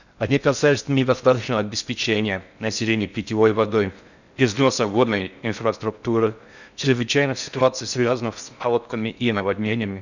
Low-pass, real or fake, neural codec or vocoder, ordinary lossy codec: 7.2 kHz; fake; codec, 16 kHz in and 24 kHz out, 0.6 kbps, FocalCodec, streaming, 4096 codes; none